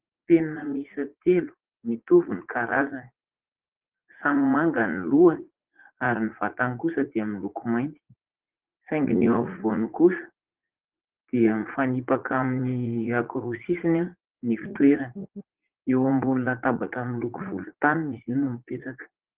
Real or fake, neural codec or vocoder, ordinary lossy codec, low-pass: fake; vocoder, 44.1 kHz, 80 mel bands, Vocos; Opus, 16 kbps; 3.6 kHz